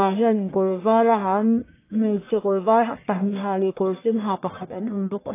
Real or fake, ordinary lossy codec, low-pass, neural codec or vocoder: fake; AAC, 24 kbps; 3.6 kHz; codec, 44.1 kHz, 1.7 kbps, Pupu-Codec